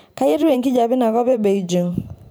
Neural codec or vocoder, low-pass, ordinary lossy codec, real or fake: vocoder, 44.1 kHz, 128 mel bands every 512 samples, BigVGAN v2; none; none; fake